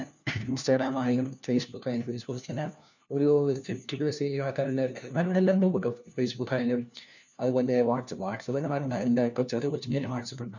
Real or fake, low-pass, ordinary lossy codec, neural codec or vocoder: fake; 7.2 kHz; none; codec, 16 kHz, 1 kbps, FunCodec, trained on LibriTTS, 50 frames a second